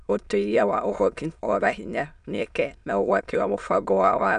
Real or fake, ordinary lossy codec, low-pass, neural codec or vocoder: fake; none; 9.9 kHz; autoencoder, 22.05 kHz, a latent of 192 numbers a frame, VITS, trained on many speakers